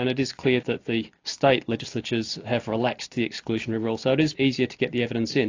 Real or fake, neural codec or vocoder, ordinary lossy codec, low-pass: real; none; AAC, 48 kbps; 7.2 kHz